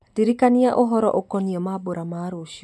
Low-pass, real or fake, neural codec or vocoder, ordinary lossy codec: none; real; none; none